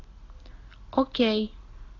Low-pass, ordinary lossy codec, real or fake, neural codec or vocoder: 7.2 kHz; AAC, 32 kbps; real; none